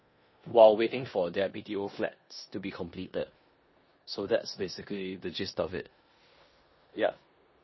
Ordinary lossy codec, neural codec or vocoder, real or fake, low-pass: MP3, 24 kbps; codec, 16 kHz in and 24 kHz out, 0.9 kbps, LongCat-Audio-Codec, four codebook decoder; fake; 7.2 kHz